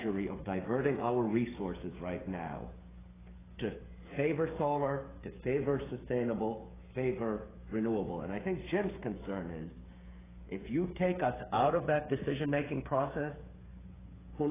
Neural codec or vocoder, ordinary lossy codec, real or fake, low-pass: codec, 16 kHz, 8 kbps, FreqCodec, smaller model; AAC, 16 kbps; fake; 3.6 kHz